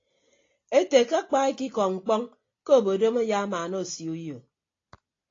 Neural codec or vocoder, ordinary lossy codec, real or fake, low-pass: none; AAC, 32 kbps; real; 7.2 kHz